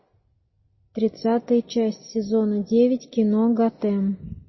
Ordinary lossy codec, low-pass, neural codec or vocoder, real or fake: MP3, 24 kbps; 7.2 kHz; none; real